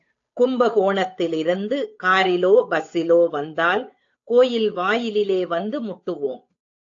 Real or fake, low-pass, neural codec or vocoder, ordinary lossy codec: fake; 7.2 kHz; codec, 16 kHz, 8 kbps, FunCodec, trained on Chinese and English, 25 frames a second; AAC, 48 kbps